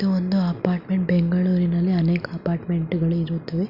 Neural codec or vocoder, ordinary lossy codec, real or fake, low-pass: none; Opus, 64 kbps; real; 5.4 kHz